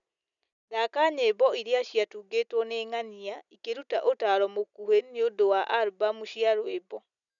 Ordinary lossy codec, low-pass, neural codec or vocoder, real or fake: none; 7.2 kHz; none; real